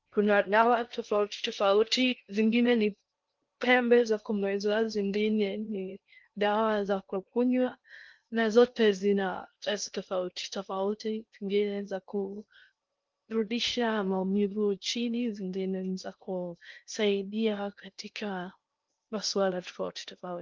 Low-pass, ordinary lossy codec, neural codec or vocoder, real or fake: 7.2 kHz; Opus, 24 kbps; codec, 16 kHz in and 24 kHz out, 0.6 kbps, FocalCodec, streaming, 2048 codes; fake